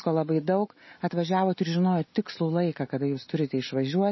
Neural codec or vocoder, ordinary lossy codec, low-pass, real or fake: none; MP3, 24 kbps; 7.2 kHz; real